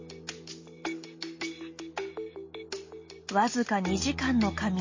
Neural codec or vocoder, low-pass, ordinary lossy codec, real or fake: none; 7.2 kHz; MP3, 32 kbps; real